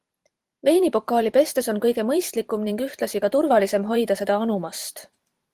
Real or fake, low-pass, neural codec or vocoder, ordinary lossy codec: fake; 14.4 kHz; vocoder, 44.1 kHz, 128 mel bands every 512 samples, BigVGAN v2; Opus, 24 kbps